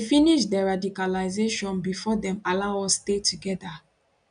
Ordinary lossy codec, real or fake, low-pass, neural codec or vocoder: none; real; 9.9 kHz; none